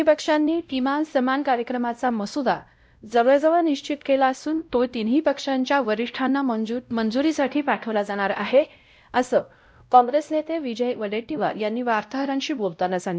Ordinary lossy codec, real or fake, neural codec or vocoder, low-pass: none; fake; codec, 16 kHz, 0.5 kbps, X-Codec, WavLM features, trained on Multilingual LibriSpeech; none